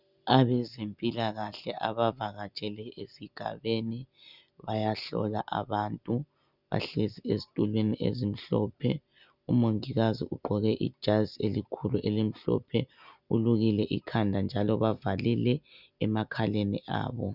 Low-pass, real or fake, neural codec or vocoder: 5.4 kHz; real; none